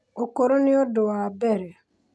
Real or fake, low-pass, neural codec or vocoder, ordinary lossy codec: real; none; none; none